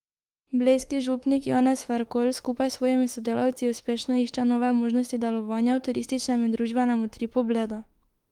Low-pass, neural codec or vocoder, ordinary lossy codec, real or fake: 19.8 kHz; autoencoder, 48 kHz, 32 numbers a frame, DAC-VAE, trained on Japanese speech; Opus, 24 kbps; fake